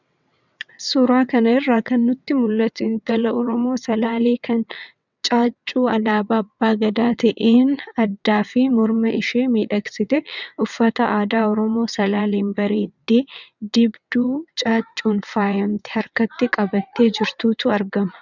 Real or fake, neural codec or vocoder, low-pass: fake; vocoder, 22.05 kHz, 80 mel bands, WaveNeXt; 7.2 kHz